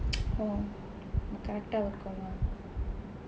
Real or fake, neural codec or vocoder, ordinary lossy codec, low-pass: real; none; none; none